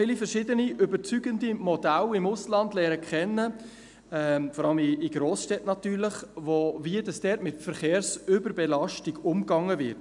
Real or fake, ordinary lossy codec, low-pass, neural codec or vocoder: real; none; 10.8 kHz; none